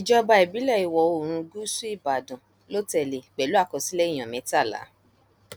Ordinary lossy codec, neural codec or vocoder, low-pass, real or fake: none; none; none; real